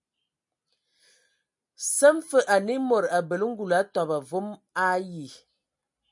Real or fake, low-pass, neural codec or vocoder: real; 10.8 kHz; none